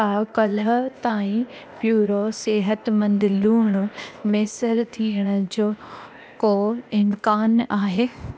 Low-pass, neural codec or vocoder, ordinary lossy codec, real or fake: none; codec, 16 kHz, 0.8 kbps, ZipCodec; none; fake